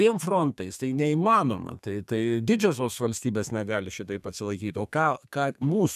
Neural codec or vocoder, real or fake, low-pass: codec, 32 kHz, 1.9 kbps, SNAC; fake; 14.4 kHz